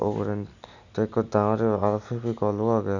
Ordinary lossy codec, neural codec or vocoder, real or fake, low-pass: none; none; real; 7.2 kHz